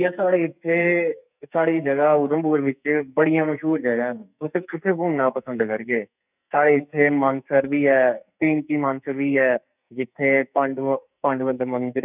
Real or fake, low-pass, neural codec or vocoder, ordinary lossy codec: fake; 3.6 kHz; codec, 44.1 kHz, 2.6 kbps, SNAC; none